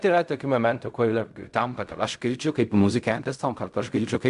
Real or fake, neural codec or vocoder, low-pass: fake; codec, 16 kHz in and 24 kHz out, 0.4 kbps, LongCat-Audio-Codec, fine tuned four codebook decoder; 10.8 kHz